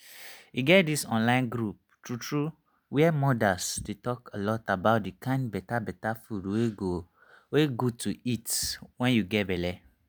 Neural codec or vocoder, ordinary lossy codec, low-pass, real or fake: none; none; none; real